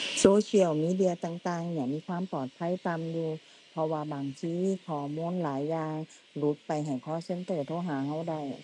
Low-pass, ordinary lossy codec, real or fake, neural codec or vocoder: 10.8 kHz; none; real; none